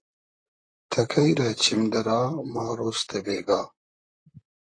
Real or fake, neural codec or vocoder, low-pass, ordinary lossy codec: fake; vocoder, 44.1 kHz, 128 mel bands, Pupu-Vocoder; 9.9 kHz; AAC, 32 kbps